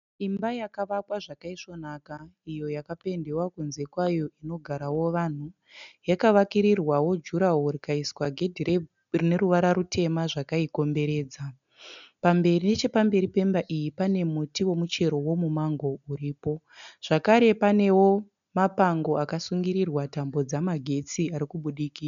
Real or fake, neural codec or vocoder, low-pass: real; none; 7.2 kHz